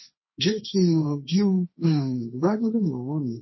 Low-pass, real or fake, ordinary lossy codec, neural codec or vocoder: 7.2 kHz; fake; MP3, 24 kbps; codec, 16 kHz, 1.1 kbps, Voila-Tokenizer